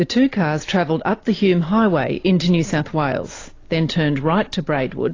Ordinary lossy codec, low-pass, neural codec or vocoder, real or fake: AAC, 32 kbps; 7.2 kHz; none; real